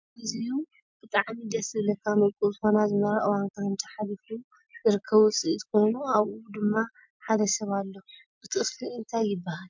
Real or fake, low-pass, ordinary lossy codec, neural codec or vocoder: real; 7.2 kHz; MP3, 64 kbps; none